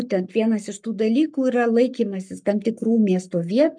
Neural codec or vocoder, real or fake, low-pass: codec, 44.1 kHz, 7.8 kbps, Pupu-Codec; fake; 9.9 kHz